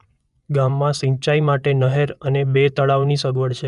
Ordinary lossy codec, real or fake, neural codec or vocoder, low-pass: none; fake; vocoder, 24 kHz, 100 mel bands, Vocos; 10.8 kHz